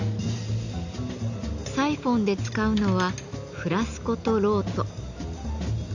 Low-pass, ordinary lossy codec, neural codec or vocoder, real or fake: 7.2 kHz; none; none; real